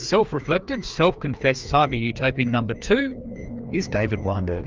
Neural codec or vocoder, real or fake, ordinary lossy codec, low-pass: codec, 16 kHz, 2 kbps, FreqCodec, larger model; fake; Opus, 24 kbps; 7.2 kHz